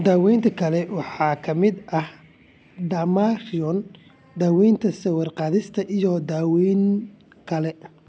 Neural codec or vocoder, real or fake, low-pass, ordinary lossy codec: none; real; none; none